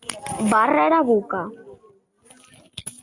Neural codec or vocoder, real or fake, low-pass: none; real; 10.8 kHz